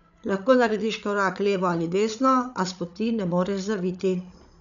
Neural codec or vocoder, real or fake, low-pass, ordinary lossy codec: codec, 16 kHz, 8 kbps, FreqCodec, larger model; fake; 7.2 kHz; none